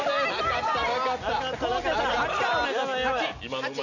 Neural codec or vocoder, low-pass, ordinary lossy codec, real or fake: none; 7.2 kHz; none; real